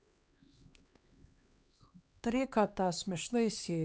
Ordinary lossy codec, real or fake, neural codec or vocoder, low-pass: none; fake; codec, 16 kHz, 2 kbps, X-Codec, HuBERT features, trained on LibriSpeech; none